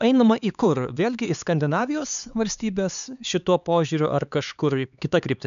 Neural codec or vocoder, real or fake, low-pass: codec, 16 kHz, 4 kbps, X-Codec, HuBERT features, trained on LibriSpeech; fake; 7.2 kHz